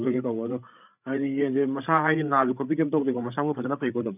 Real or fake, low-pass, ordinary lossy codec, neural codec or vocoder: fake; 3.6 kHz; none; codec, 16 kHz, 8 kbps, FreqCodec, larger model